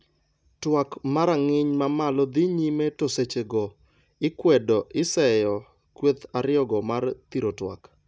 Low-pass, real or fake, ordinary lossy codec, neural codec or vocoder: none; real; none; none